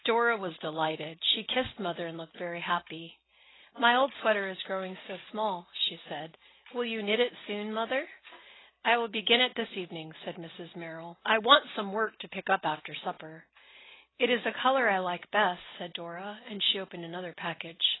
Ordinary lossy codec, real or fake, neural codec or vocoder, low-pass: AAC, 16 kbps; real; none; 7.2 kHz